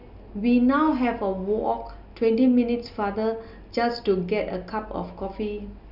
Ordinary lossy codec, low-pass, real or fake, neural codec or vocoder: none; 5.4 kHz; real; none